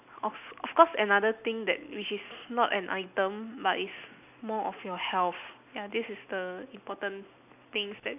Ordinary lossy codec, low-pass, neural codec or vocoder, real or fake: none; 3.6 kHz; none; real